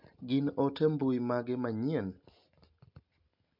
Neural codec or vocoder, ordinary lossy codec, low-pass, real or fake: none; MP3, 48 kbps; 5.4 kHz; real